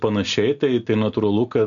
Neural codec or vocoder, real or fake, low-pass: none; real; 7.2 kHz